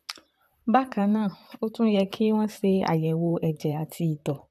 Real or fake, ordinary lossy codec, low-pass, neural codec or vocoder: fake; none; 14.4 kHz; vocoder, 44.1 kHz, 128 mel bands, Pupu-Vocoder